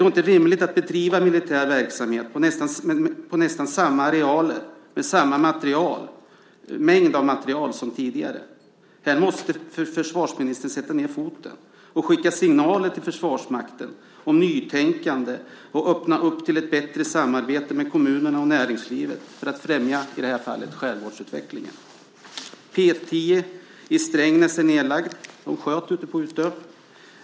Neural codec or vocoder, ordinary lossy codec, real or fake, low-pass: none; none; real; none